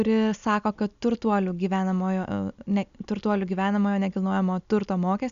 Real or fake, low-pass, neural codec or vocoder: real; 7.2 kHz; none